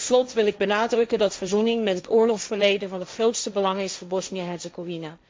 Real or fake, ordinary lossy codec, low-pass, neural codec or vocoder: fake; none; none; codec, 16 kHz, 1.1 kbps, Voila-Tokenizer